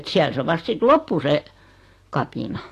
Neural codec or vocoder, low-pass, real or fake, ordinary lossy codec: none; 14.4 kHz; real; AAC, 48 kbps